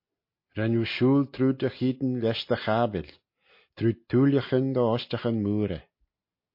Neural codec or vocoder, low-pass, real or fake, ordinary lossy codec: none; 5.4 kHz; real; MP3, 32 kbps